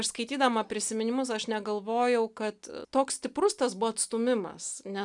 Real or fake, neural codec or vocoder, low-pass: real; none; 10.8 kHz